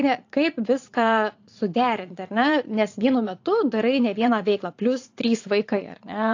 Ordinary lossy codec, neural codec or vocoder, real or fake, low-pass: AAC, 48 kbps; vocoder, 44.1 kHz, 80 mel bands, Vocos; fake; 7.2 kHz